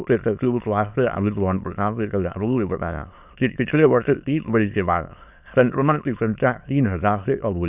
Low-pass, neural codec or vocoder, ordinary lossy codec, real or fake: 3.6 kHz; autoencoder, 22.05 kHz, a latent of 192 numbers a frame, VITS, trained on many speakers; none; fake